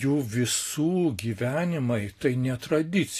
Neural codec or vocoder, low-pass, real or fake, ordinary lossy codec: none; 14.4 kHz; real; AAC, 48 kbps